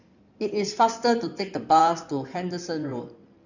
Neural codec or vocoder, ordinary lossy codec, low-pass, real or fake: codec, 16 kHz in and 24 kHz out, 2.2 kbps, FireRedTTS-2 codec; none; 7.2 kHz; fake